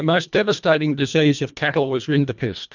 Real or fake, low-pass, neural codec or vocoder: fake; 7.2 kHz; codec, 24 kHz, 1.5 kbps, HILCodec